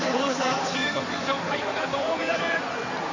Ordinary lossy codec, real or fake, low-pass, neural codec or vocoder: MP3, 64 kbps; fake; 7.2 kHz; codec, 16 kHz in and 24 kHz out, 2.2 kbps, FireRedTTS-2 codec